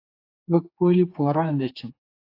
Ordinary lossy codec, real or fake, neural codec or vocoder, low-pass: AAC, 32 kbps; fake; codec, 16 kHz, 4 kbps, X-Codec, HuBERT features, trained on general audio; 5.4 kHz